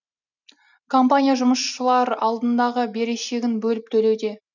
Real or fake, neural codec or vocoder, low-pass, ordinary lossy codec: real; none; 7.2 kHz; none